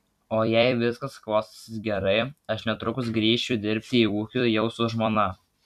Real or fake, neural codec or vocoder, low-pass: fake; vocoder, 44.1 kHz, 128 mel bands every 256 samples, BigVGAN v2; 14.4 kHz